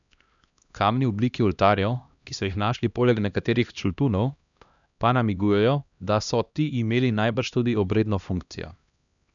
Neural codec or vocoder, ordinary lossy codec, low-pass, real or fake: codec, 16 kHz, 1 kbps, X-Codec, HuBERT features, trained on LibriSpeech; none; 7.2 kHz; fake